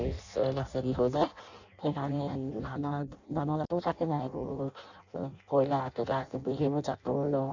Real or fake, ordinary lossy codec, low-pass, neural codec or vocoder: fake; none; 7.2 kHz; codec, 16 kHz in and 24 kHz out, 0.6 kbps, FireRedTTS-2 codec